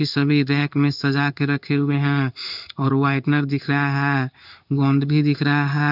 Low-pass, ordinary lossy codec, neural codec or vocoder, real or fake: 5.4 kHz; none; codec, 16 kHz in and 24 kHz out, 1 kbps, XY-Tokenizer; fake